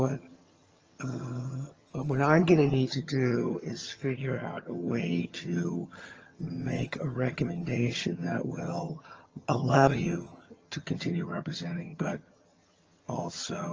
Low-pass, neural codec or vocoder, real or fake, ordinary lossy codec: 7.2 kHz; vocoder, 22.05 kHz, 80 mel bands, HiFi-GAN; fake; Opus, 24 kbps